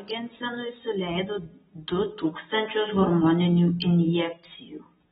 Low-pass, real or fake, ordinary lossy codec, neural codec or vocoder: 14.4 kHz; real; AAC, 16 kbps; none